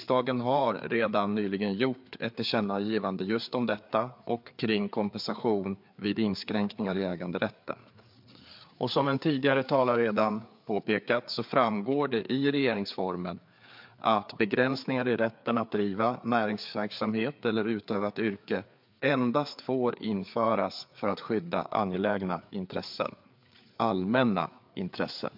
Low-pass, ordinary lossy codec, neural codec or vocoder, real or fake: 5.4 kHz; MP3, 48 kbps; codec, 16 kHz, 4 kbps, FreqCodec, larger model; fake